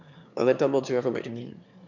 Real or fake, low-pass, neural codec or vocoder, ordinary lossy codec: fake; 7.2 kHz; autoencoder, 22.05 kHz, a latent of 192 numbers a frame, VITS, trained on one speaker; none